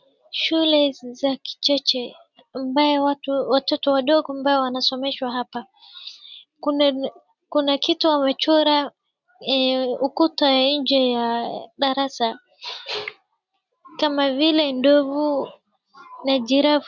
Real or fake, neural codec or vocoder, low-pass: real; none; 7.2 kHz